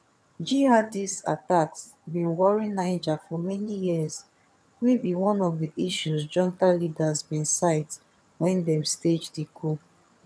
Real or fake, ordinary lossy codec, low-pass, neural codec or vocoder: fake; none; none; vocoder, 22.05 kHz, 80 mel bands, HiFi-GAN